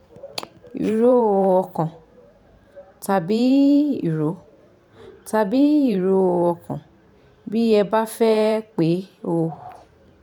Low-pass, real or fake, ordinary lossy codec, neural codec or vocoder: 19.8 kHz; fake; none; vocoder, 44.1 kHz, 128 mel bands every 512 samples, BigVGAN v2